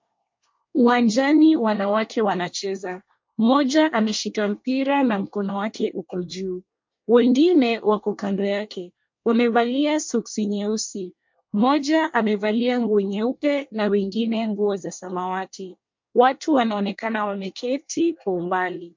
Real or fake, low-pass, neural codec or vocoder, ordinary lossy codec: fake; 7.2 kHz; codec, 24 kHz, 1 kbps, SNAC; MP3, 48 kbps